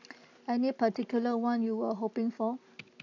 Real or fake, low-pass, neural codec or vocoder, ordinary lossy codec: real; 7.2 kHz; none; AAC, 48 kbps